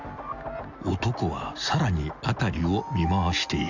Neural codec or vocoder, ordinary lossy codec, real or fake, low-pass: none; none; real; 7.2 kHz